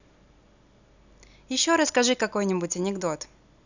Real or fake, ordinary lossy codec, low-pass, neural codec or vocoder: real; none; 7.2 kHz; none